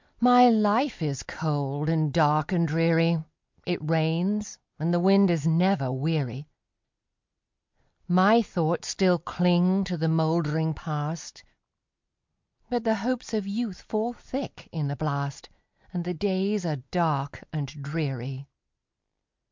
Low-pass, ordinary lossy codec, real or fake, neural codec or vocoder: 7.2 kHz; MP3, 64 kbps; real; none